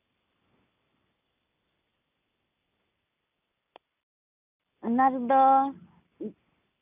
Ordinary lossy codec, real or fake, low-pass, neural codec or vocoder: none; real; 3.6 kHz; none